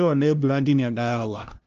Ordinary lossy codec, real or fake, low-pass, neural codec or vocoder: Opus, 24 kbps; fake; 7.2 kHz; codec, 16 kHz, 0.5 kbps, FunCodec, trained on LibriTTS, 25 frames a second